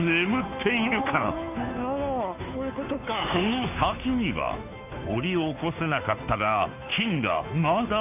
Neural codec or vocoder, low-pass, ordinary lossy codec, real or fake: codec, 16 kHz, 2 kbps, FunCodec, trained on Chinese and English, 25 frames a second; 3.6 kHz; none; fake